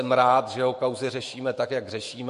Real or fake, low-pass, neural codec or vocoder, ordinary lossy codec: fake; 14.4 kHz; autoencoder, 48 kHz, 128 numbers a frame, DAC-VAE, trained on Japanese speech; MP3, 48 kbps